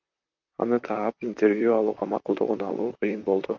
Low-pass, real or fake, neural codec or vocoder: 7.2 kHz; fake; vocoder, 44.1 kHz, 128 mel bands, Pupu-Vocoder